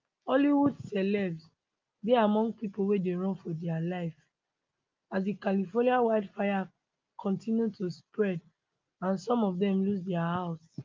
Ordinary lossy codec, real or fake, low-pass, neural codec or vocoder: Opus, 24 kbps; real; 7.2 kHz; none